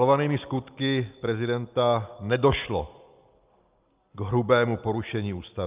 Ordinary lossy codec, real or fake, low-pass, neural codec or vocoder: Opus, 24 kbps; real; 3.6 kHz; none